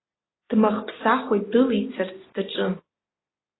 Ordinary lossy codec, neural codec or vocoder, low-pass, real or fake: AAC, 16 kbps; none; 7.2 kHz; real